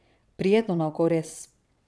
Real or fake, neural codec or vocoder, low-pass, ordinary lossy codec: fake; vocoder, 22.05 kHz, 80 mel bands, WaveNeXt; none; none